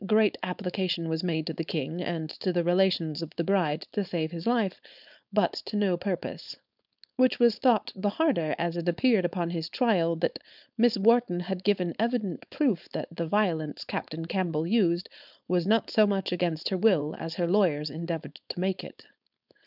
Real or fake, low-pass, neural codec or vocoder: fake; 5.4 kHz; codec, 16 kHz, 4.8 kbps, FACodec